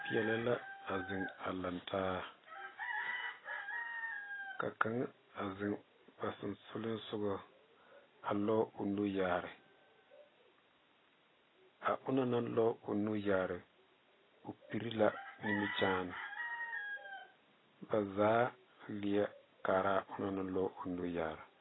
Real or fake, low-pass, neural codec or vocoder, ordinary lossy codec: real; 7.2 kHz; none; AAC, 16 kbps